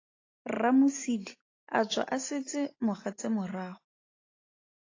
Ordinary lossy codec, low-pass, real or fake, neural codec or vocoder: AAC, 32 kbps; 7.2 kHz; real; none